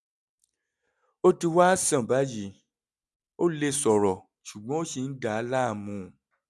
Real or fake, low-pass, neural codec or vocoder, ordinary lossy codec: real; none; none; none